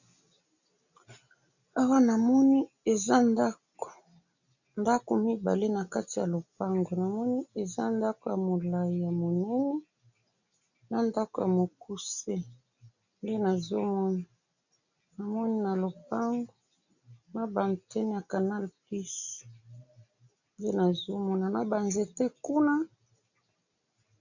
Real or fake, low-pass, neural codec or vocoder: real; 7.2 kHz; none